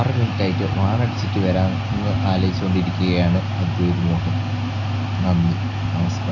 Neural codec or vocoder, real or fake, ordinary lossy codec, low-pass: none; real; none; 7.2 kHz